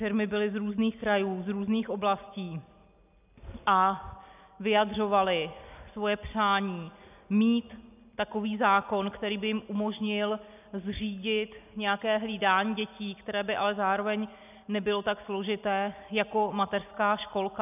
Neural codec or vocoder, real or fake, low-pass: none; real; 3.6 kHz